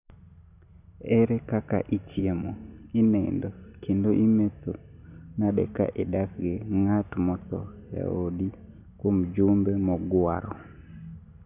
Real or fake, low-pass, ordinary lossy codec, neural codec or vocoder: real; 3.6 kHz; none; none